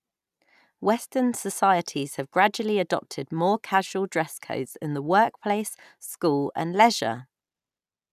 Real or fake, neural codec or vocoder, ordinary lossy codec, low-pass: real; none; none; 14.4 kHz